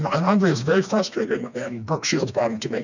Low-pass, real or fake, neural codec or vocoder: 7.2 kHz; fake; codec, 16 kHz, 2 kbps, FreqCodec, smaller model